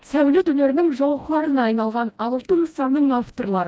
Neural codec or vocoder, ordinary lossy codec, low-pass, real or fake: codec, 16 kHz, 1 kbps, FreqCodec, smaller model; none; none; fake